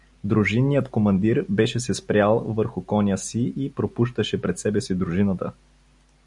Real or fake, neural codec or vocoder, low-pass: real; none; 10.8 kHz